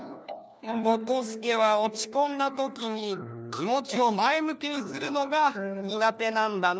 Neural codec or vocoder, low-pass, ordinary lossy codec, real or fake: codec, 16 kHz, 1 kbps, FunCodec, trained on LibriTTS, 50 frames a second; none; none; fake